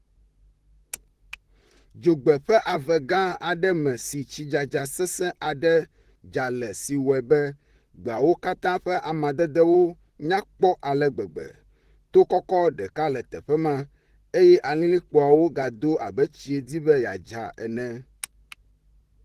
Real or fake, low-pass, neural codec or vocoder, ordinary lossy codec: fake; 14.4 kHz; vocoder, 44.1 kHz, 128 mel bands, Pupu-Vocoder; Opus, 16 kbps